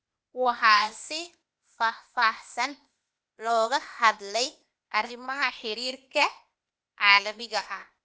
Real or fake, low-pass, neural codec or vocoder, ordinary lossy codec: fake; none; codec, 16 kHz, 0.8 kbps, ZipCodec; none